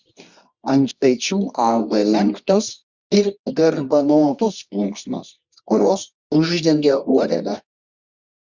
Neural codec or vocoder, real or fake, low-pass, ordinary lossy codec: codec, 24 kHz, 0.9 kbps, WavTokenizer, medium music audio release; fake; 7.2 kHz; Opus, 64 kbps